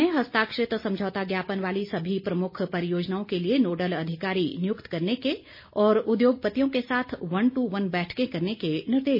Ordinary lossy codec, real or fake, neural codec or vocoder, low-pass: MP3, 24 kbps; real; none; 5.4 kHz